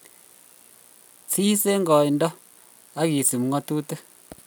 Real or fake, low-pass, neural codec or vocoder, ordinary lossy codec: real; none; none; none